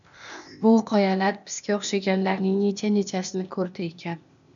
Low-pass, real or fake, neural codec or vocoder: 7.2 kHz; fake; codec, 16 kHz, 0.8 kbps, ZipCodec